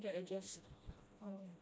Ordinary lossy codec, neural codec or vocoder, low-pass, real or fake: none; codec, 16 kHz, 1 kbps, FreqCodec, smaller model; none; fake